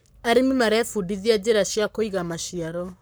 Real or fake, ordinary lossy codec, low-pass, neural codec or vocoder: fake; none; none; codec, 44.1 kHz, 7.8 kbps, Pupu-Codec